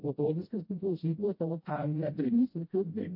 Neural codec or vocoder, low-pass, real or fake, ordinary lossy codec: codec, 16 kHz, 0.5 kbps, FreqCodec, smaller model; 5.4 kHz; fake; MP3, 32 kbps